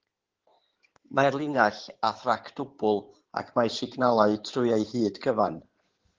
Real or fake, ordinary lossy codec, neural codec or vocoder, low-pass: fake; Opus, 16 kbps; codec, 16 kHz in and 24 kHz out, 2.2 kbps, FireRedTTS-2 codec; 7.2 kHz